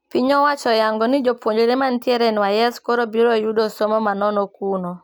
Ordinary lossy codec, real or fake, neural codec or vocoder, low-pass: none; real; none; none